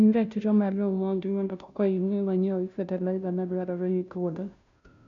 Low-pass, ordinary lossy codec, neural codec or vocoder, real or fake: 7.2 kHz; none; codec, 16 kHz, 0.5 kbps, FunCodec, trained on Chinese and English, 25 frames a second; fake